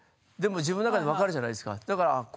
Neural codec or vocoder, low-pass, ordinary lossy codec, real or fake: none; none; none; real